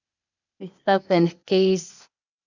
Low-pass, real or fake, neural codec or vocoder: 7.2 kHz; fake; codec, 16 kHz, 0.8 kbps, ZipCodec